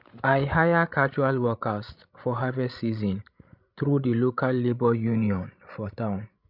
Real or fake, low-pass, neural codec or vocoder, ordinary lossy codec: fake; 5.4 kHz; codec, 16 kHz, 16 kbps, FreqCodec, larger model; none